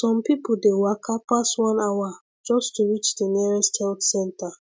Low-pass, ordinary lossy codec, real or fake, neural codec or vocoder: none; none; real; none